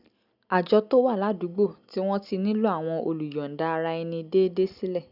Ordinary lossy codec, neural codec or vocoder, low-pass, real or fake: none; none; 5.4 kHz; real